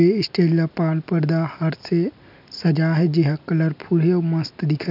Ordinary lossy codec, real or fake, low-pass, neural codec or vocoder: none; real; 5.4 kHz; none